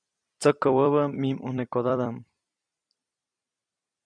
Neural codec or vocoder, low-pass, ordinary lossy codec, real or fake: vocoder, 44.1 kHz, 128 mel bands every 256 samples, BigVGAN v2; 9.9 kHz; MP3, 96 kbps; fake